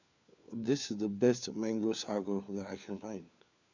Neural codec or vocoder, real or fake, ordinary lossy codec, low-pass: codec, 16 kHz, 4 kbps, FunCodec, trained on LibriTTS, 50 frames a second; fake; none; 7.2 kHz